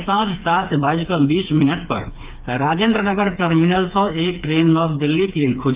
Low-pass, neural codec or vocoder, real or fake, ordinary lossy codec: 3.6 kHz; codec, 16 kHz, 4 kbps, FreqCodec, smaller model; fake; Opus, 32 kbps